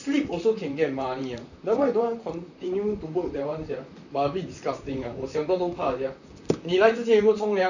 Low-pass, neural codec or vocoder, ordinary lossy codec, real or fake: 7.2 kHz; vocoder, 44.1 kHz, 128 mel bands, Pupu-Vocoder; none; fake